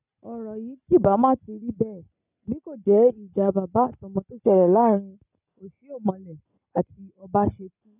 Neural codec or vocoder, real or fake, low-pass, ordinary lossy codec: none; real; 3.6 kHz; none